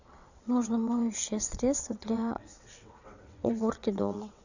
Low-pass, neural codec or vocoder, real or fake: 7.2 kHz; vocoder, 22.05 kHz, 80 mel bands, WaveNeXt; fake